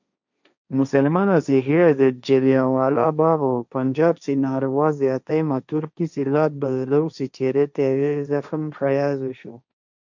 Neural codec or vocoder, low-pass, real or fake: codec, 16 kHz, 1.1 kbps, Voila-Tokenizer; 7.2 kHz; fake